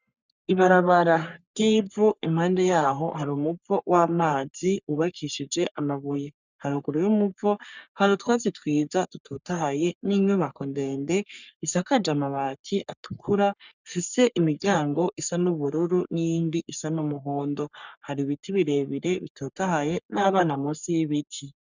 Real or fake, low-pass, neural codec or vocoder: fake; 7.2 kHz; codec, 44.1 kHz, 3.4 kbps, Pupu-Codec